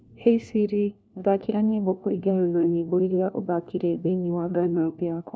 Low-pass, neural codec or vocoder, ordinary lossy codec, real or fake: none; codec, 16 kHz, 1 kbps, FunCodec, trained on LibriTTS, 50 frames a second; none; fake